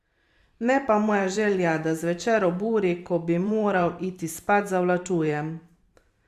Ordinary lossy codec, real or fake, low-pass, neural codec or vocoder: Opus, 64 kbps; fake; 14.4 kHz; vocoder, 44.1 kHz, 128 mel bands every 512 samples, BigVGAN v2